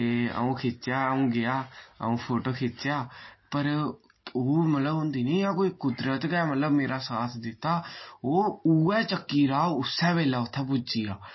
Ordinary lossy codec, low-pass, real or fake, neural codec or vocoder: MP3, 24 kbps; 7.2 kHz; real; none